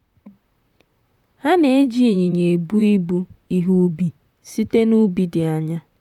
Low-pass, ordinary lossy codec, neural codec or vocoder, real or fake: 19.8 kHz; none; vocoder, 44.1 kHz, 128 mel bands, Pupu-Vocoder; fake